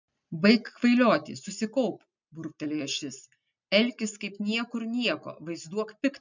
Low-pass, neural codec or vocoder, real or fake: 7.2 kHz; none; real